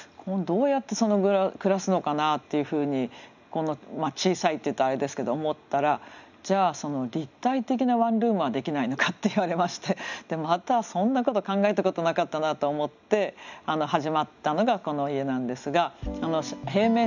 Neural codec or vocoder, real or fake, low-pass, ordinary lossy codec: none; real; 7.2 kHz; none